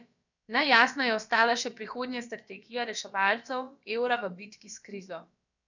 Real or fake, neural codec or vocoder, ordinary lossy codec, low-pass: fake; codec, 16 kHz, about 1 kbps, DyCAST, with the encoder's durations; none; 7.2 kHz